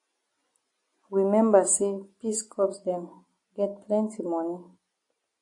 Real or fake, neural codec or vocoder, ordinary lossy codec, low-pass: real; none; AAC, 48 kbps; 10.8 kHz